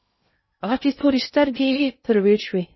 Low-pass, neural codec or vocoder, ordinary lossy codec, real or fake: 7.2 kHz; codec, 16 kHz in and 24 kHz out, 0.6 kbps, FocalCodec, streaming, 2048 codes; MP3, 24 kbps; fake